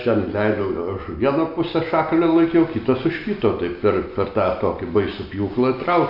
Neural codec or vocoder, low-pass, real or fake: none; 5.4 kHz; real